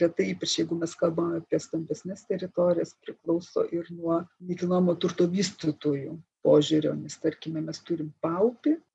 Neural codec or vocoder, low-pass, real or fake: none; 10.8 kHz; real